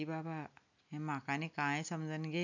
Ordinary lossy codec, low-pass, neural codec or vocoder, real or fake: none; 7.2 kHz; none; real